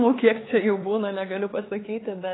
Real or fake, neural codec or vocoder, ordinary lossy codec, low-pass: fake; codec, 16 kHz, 4 kbps, X-Codec, HuBERT features, trained on LibriSpeech; AAC, 16 kbps; 7.2 kHz